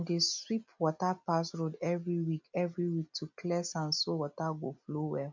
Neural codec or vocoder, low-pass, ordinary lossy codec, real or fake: none; 7.2 kHz; MP3, 64 kbps; real